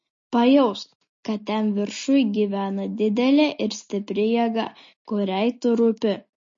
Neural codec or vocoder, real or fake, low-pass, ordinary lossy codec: none; real; 7.2 kHz; MP3, 32 kbps